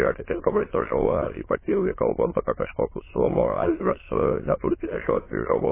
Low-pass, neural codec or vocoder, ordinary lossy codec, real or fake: 3.6 kHz; autoencoder, 22.05 kHz, a latent of 192 numbers a frame, VITS, trained on many speakers; MP3, 16 kbps; fake